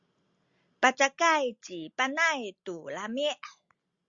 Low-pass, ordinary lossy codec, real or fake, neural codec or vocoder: 7.2 kHz; Opus, 64 kbps; real; none